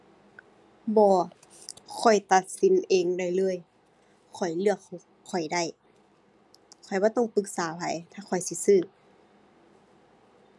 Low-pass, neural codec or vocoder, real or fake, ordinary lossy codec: none; none; real; none